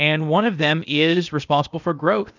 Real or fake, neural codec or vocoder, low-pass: fake; codec, 16 kHz, 0.8 kbps, ZipCodec; 7.2 kHz